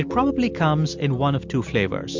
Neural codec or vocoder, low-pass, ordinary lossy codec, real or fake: none; 7.2 kHz; MP3, 64 kbps; real